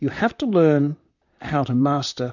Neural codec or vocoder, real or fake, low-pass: vocoder, 44.1 kHz, 128 mel bands, Pupu-Vocoder; fake; 7.2 kHz